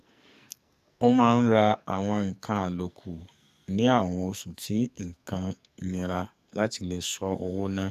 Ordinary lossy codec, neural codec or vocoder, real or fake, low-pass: none; codec, 44.1 kHz, 2.6 kbps, SNAC; fake; 14.4 kHz